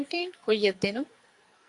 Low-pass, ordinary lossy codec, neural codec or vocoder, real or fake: 10.8 kHz; Opus, 64 kbps; codec, 44.1 kHz, 2.6 kbps, SNAC; fake